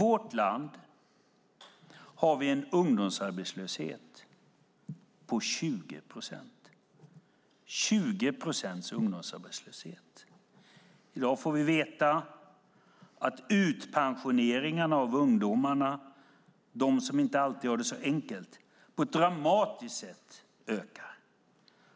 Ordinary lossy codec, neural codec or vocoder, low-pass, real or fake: none; none; none; real